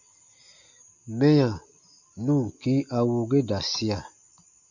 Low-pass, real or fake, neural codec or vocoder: 7.2 kHz; real; none